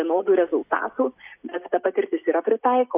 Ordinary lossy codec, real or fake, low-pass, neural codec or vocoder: AAC, 32 kbps; real; 3.6 kHz; none